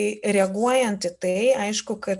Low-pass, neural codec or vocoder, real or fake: 10.8 kHz; none; real